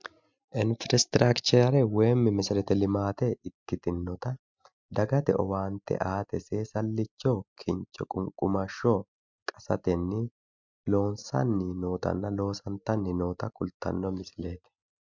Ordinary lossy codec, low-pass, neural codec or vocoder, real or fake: MP3, 64 kbps; 7.2 kHz; none; real